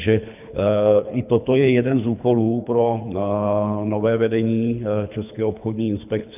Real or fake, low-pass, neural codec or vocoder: fake; 3.6 kHz; codec, 24 kHz, 3 kbps, HILCodec